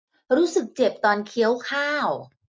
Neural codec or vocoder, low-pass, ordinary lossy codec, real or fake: none; none; none; real